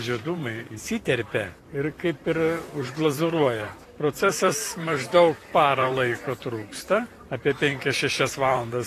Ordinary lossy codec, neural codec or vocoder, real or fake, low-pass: AAC, 48 kbps; vocoder, 44.1 kHz, 128 mel bands, Pupu-Vocoder; fake; 14.4 kHz